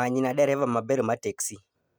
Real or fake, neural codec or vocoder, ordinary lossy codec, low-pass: real; none; none; none